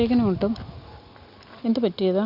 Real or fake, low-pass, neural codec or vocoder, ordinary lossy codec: real; 5.4 kHz; none; Opus, 64 kbps